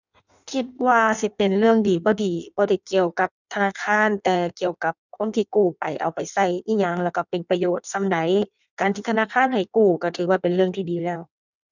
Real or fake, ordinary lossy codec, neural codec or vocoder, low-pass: fake; none; codec, 16 kHz in and 24 kHz out, 1.1 kbps, FireRedTTS-2 codec; 7.2 kHz